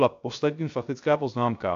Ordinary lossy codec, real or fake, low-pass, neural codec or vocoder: AAC, 64 kbps; fake; 7.2 kHz; codec, 16 kHz, 0.3 kbps, FocalCodec